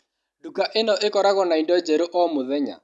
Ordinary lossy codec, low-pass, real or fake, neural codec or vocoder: none; none; real; none